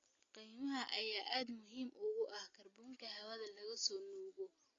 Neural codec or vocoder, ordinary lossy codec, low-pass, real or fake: none; AAC, 32 kbps; 7.2 kHz; real